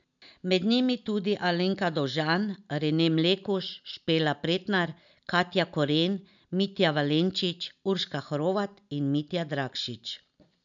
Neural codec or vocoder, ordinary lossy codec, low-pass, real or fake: none; none; 7.2 kHz; real